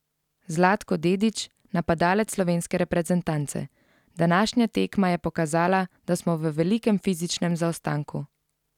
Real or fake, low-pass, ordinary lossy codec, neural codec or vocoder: real; 19.8 kHz; none; none